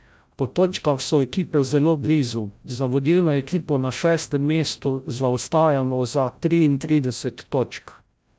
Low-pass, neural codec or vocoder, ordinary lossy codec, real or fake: none; codec, 16 kHz, 0.5 kbps, FreqCodec, larger model; none; fake